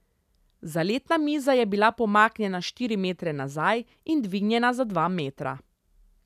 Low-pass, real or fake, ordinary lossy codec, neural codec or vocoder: 14.4 kHz; real; none; none